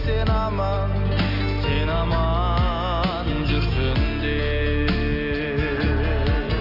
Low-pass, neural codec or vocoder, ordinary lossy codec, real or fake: 5.4 kHz; none; none; real